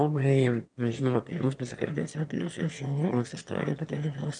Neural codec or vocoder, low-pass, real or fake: autoencoder, 22.05 kHz, a latent of 192 numbers a frame, VITS, trained on one speaker; 9.9 kHz; fake